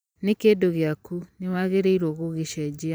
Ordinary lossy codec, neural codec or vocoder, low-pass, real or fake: none; vocoder, 44.1 kHz, 128 mel bands every 512 samples, BigVGAN v2; none; fake